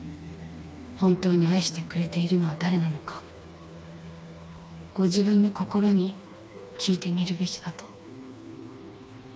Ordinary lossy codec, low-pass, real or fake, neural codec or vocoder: none; none; fake; codec, 16 kHz, 2 kbps, FreqCodec, smaller model